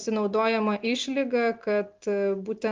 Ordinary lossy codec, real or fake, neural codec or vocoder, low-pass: Opus, 32 kbps; real; none; 7.2 kHz